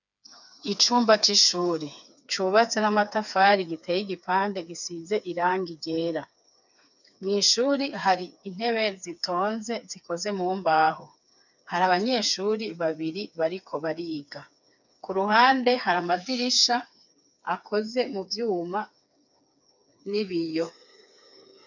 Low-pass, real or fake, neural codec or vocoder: 7.2 kHz; fake; codec, 16 kHz, 4 kbps, FreqCodec, smaller model